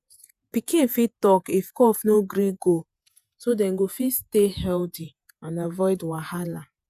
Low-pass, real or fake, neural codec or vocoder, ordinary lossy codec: 14.4 kHz; fake; vocoder, 48 kHz, 128 mel bands, Vocos; none